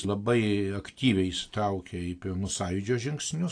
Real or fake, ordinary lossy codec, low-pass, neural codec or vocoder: real; AAC, 48 kbps; 9.9 kHz; none